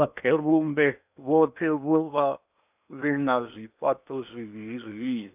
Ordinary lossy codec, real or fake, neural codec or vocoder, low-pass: none; fake; codec, 16 kHz in and 24 kHz out, 0.8 kbps, FocalCodec, streaming, 65536 codes; 3.6 kHz